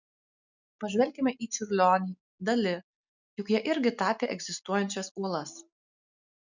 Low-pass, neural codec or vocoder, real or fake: 7.2 kHz; none; real